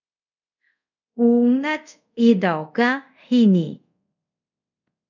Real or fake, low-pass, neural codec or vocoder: fake; 7.2 kHz; codec, 24 kHz, 0.5 kbps, DualCodec